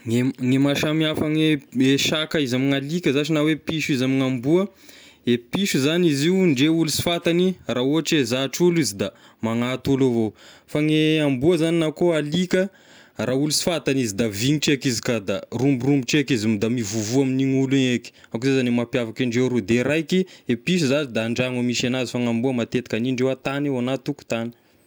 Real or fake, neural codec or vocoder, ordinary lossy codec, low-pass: real; none; none; none